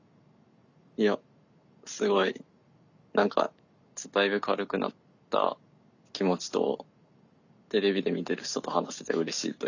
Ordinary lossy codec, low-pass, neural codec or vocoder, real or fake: none; 7.2 kHz; none; real